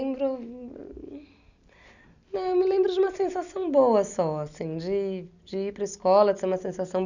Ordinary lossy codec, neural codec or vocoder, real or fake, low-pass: none; none; real; 7.2 kHz